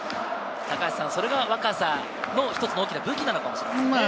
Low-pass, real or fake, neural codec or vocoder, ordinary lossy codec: none; real; none; none